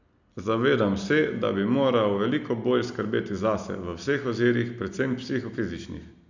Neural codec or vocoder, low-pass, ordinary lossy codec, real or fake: none; 7.2 kHz; none; real